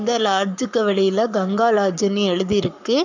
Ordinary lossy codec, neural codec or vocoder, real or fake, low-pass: none; codec, 44.1 kHz, 7.8 kbps, Pupu-Codec; fake; 7.2 kHz